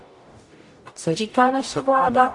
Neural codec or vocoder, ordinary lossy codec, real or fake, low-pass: codec, 44.1 kHz, 0.9 kbps, DAC; AAC, 64 kbps; fake; 10.8 kHz